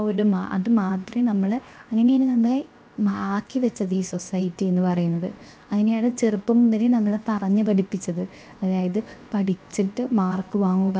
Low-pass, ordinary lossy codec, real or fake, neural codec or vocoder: none; none; fake; codec, 16 kHz, 0.7 kbps, FocalCodec